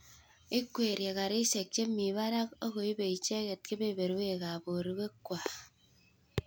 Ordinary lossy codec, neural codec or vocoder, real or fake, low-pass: none; none; real; none